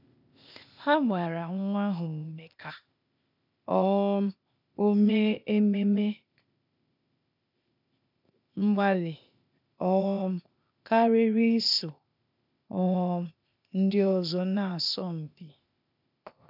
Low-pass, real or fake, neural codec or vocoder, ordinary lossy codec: 5.4 kHz; fake; codec, 16 kHz, 0.8 kbps, ZipCodec; none